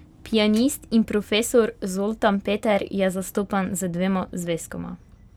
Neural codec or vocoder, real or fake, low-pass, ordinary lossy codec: vocoder, 44.1 kHz, 128 mel bands every 512 samples, BigVGAN v2; fake; 19.8 kHz; none